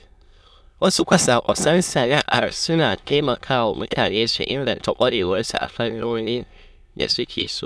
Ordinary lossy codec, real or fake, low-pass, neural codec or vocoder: none; fake; none; autoencoder, 22.05 kHz, a latent of 192 numbers a frame, VITS, trained on many speakers